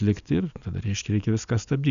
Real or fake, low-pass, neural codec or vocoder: real; 7.2 kHz; none